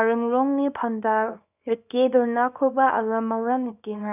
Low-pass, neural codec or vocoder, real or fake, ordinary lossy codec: 3.6 kHz; codec, 24 kHz, 0.9 kbps, WavTokenizer, small release; fake; none